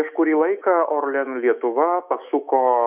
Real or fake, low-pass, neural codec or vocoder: fake; 3.6 kHz; autoencoder, 48 kHz, 128 numbers a frame, DAC-VAE, trained on Japanese speech